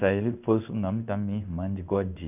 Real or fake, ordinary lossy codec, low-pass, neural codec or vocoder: fake; none; 3.6 kHz; codec, 16 kHz in and 24 kHz out, 1 kbps, XY-Tokenizer